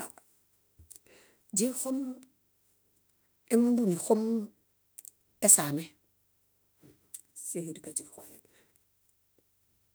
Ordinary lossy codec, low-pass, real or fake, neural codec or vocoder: none; none; fake; autoencoder, 48 kHz, 32 numbers a frame, DAC-VAE, trained on Japanese speech